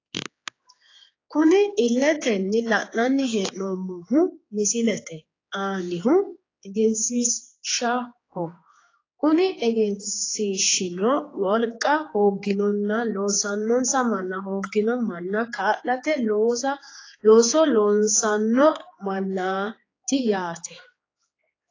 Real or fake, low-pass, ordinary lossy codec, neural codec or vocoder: fake; 7.2 kHz; AAC, 32 kbps; codec, 16 kHz, 4 kbps, X-Codec, HuBERT features, trained on general audio